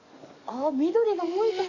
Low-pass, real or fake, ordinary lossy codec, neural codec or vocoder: 7.2 kHz; fake; none; codec, 44.1 kHz, 7.8 kbps, DAC